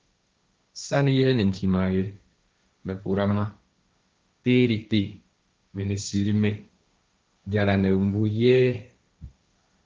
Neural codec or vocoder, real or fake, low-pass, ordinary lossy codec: codec, 16 kHz, 1.1 kbps, Voila-Tokenizer; fake; 7.2 kHz; Opus, 24 kbps